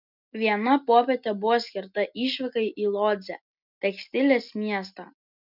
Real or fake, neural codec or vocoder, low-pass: real; none; 5.4 kHz